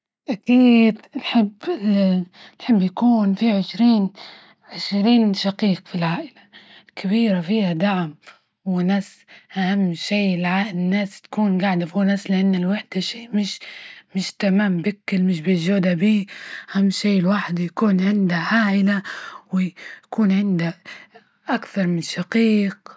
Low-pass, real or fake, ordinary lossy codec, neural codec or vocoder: none; real; none; none